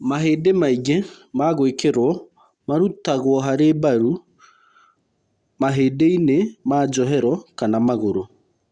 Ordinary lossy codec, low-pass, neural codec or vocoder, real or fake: Opus, 64 kbps; 9.9 kHz; none; real